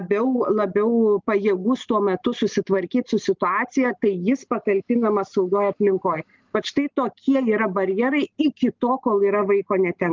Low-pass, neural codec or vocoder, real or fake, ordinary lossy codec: 7.2 kHz; none; real; Opus, 32 kbps